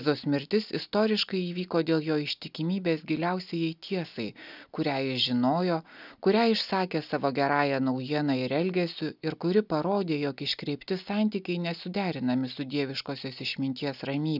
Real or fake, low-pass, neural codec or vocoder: real; 5.4 kHz; none